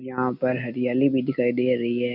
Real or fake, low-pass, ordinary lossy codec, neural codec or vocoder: real; 5.4 kHz; none; none